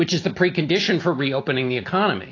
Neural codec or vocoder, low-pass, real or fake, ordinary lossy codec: none; 7.2 kHz; real; AAC, 32 kbps